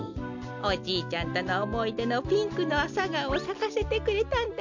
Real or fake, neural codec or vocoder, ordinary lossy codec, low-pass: real; none; MP3, 64 kbps; 7.2 kHz